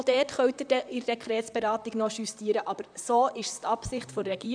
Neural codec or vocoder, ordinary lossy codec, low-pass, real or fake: vocoder, 44.1 kHz, 128 mel bands, Pupu-Vocoder; none; 9.9 kHz; fake